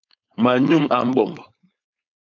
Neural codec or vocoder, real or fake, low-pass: codec, 16 kHz, 4.8 kbps, FACodec; fake; 7.2 kHz